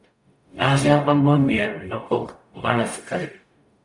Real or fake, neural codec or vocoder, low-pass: fake; codec, 44.1 kHz, 0.9 kbps, DAC; 10.8 kHz